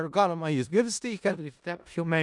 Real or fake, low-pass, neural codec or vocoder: fake; 10.8 kHz; codec, 16 kHz in and 24 kHz out, 0.4 kbps, LongCat-Audio-Codec, four codebook decoder